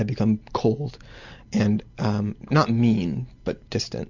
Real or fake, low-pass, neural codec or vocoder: real; 7.2 kHz; none